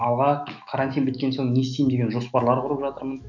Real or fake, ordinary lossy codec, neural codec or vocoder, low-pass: fake; none; autoencoder, 48 kHz, 128 numbers a frame, DAC-VAE, trained on Japanese speech; 7.2 kHz